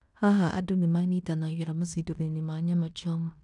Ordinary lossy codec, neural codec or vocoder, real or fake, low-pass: none; codec, 16 kHz in and 24 kHz out, 0.9 kbps, LongCat-Audio-Codec, fine tuned four codebook decoder; fake; 10.8 kHz